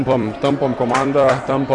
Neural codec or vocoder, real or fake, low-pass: vocoder, 44.1 kHz, 128 mel bands every 256 samples, BigVGAN v2; fake; 10.8 kHz